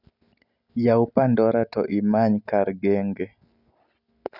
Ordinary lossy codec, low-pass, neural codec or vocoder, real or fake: none; 5.4 kHz; none; real